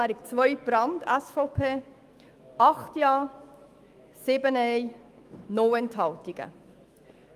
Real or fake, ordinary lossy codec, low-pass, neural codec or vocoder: fake; Opus, 32 kbps; 14.4 kHz; autoencoder, 48 kHz, 128 numbers a frame, DAC-VAE, trained on Japanese speech